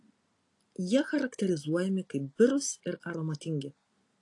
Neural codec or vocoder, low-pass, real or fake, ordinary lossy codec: none; 10.8 kHz; real; AAC, 48 kbps